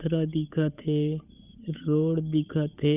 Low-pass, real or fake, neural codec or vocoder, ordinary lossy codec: 3.6 kHz; fake; codec, 16 kHz, 8 kbps, FunCodec, trained on Chinese and English, 25 frames a second; none